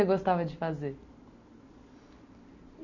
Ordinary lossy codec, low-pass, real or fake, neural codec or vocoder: none; 7.2 kHz; real; none